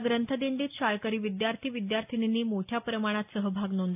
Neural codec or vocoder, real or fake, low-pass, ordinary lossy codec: none; real; 3.6 kHz; AAC, 32 kbps